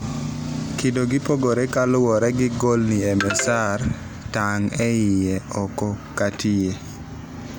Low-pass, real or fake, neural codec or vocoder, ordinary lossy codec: none; real; none; none